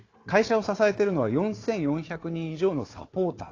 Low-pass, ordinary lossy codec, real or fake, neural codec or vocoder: 7.2 kHz; AAC, 32 kbps; fake; codec, 16 kHz, 4 kbps, FunCodec, trained on Chinese and English, 50 frames a second